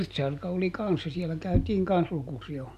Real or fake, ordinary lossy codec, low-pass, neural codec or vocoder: real; none; 14.4 kHz; none